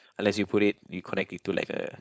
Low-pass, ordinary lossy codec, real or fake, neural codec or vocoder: none; none; fake; codec, 16 kHz, 4.8 kbps, FACodec